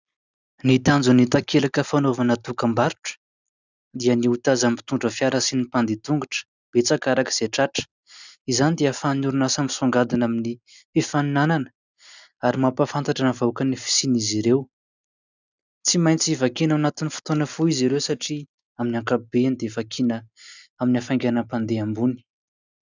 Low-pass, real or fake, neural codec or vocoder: 7.2 kHz; real; none